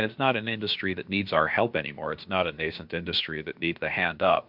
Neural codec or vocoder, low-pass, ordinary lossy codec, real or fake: codec, 16 kHz, about 1 kbps, DyCAST, with the encoder's durations; 5.4 kHz; MP3, 48 kbps; fake